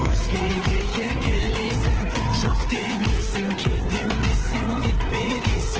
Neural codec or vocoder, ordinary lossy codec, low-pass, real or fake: codec, 16 kHz, 4 kbps, FreqCodec, larger model; Opus, 16 kbps; 7.2 kHz; fake